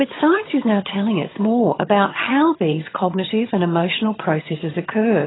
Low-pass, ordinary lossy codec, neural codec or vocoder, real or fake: 7.2 kHz; AAC, 16 kbps; vocoder, 22.05 kHz, 80 mel bands, HiFi-GAN; fake